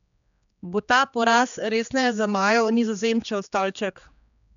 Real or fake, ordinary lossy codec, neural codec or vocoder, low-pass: fake; none; codec, 16 kHz, 2 kbps, X-Codec, HuBERT features, trained on general audio; 7.2 kHz